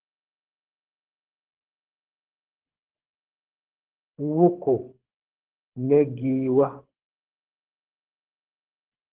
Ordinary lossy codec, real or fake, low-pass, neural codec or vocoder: Opus, 16 kbps; fake; 3.6 kHz; codec, 24 kHz, 6 kbps, HILCodec